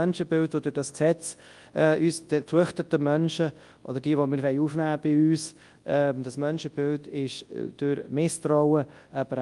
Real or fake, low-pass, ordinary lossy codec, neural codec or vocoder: fake; 10.8 kHz; Opus, 32 kbps; codec, 24 kHz, 0.9 kbps, WavTokenizer, large speech release